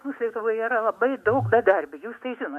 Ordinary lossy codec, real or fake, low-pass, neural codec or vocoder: MP3, 64 kbps; fake; 14.4 kHz; autoencoder, 48 kHz, 128 numbers a frame, DAC-VAE, trained on Japanese speech